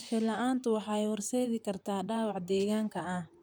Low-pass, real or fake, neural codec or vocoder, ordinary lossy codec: none; fake; vocoder, 44.1 kHz, 128 mel bands, Pupu-Vocoder; none